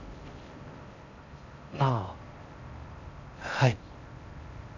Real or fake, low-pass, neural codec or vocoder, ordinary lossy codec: fake; 7.2 kHz; codec, 16 kHz in and 24 kHz out, 0.6 kbps, FocalCodec, streaming, 4096 codes; none